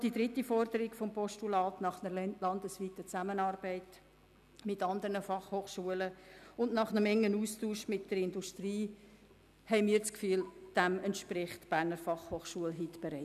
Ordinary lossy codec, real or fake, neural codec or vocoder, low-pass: none; real; none; 14.4 kHz